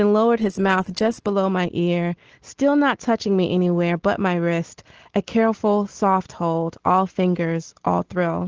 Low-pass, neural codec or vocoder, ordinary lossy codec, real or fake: 7.2 kHz; none; Opus, 16 kbps; real